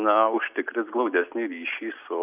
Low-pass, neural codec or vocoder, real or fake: 3.6 kHz; none; real